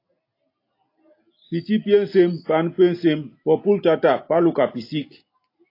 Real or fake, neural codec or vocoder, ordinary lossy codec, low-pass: real; none; AAC, 32 kbps; 5.4 kHz